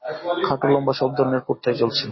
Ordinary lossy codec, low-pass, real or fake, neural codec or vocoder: MP3, 24 kbps; 7.2 kHz; real; none